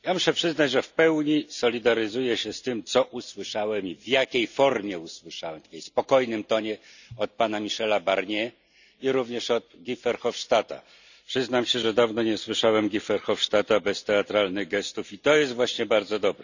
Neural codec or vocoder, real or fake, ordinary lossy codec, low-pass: none; real; none; 7.2 kHz